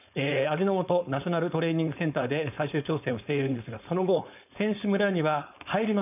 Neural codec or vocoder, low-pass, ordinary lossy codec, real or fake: codec, 16 kHz, 4.8 kbps, FACodec; 3.6 kHz; none; fake